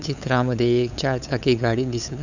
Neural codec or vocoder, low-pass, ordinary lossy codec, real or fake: codec, 16 kHz, 8 kbps, FunCodec, trained on LibriTTS, 25 frames a second; 7.2 kHz; none; fake